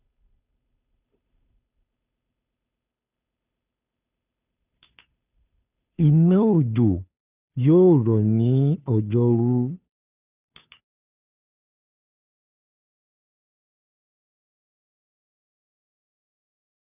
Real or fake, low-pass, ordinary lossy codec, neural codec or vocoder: fake; 3.6 kHz; none; codec, 16 kHz, 2 kbps, FunCodec, trained on Chinese and English, 25 frames a second